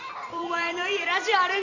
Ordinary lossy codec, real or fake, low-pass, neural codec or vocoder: none; fake; 7.2 kHz; vocoder, 44.1 kHz, 128 mel bands every 256 samples, BigVGAN v2